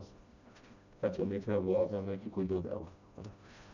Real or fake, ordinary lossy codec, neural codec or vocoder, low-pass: fake; none; codec, 16 kHz, 1 kbps, FreqCodec, smaller model; 7.2 kHz